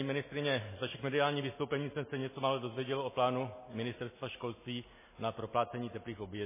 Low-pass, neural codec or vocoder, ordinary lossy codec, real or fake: 3.6 kHz; none; MP3, 16 kbps; real